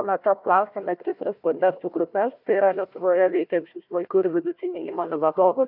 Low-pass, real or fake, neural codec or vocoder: 5.4 kHz; fake; codec, 16 kHz, 1 kbps, FunCodec, trained on Chinese and English, 50 frames a second